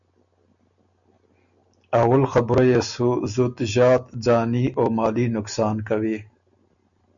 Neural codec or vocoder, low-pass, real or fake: none; 7.2 kHz; real